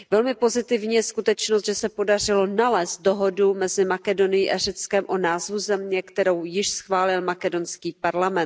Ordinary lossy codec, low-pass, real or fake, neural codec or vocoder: none; none; real; none